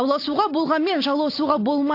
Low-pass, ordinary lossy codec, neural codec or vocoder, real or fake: 5.4 kHz; AAC, 32 kbps; none; real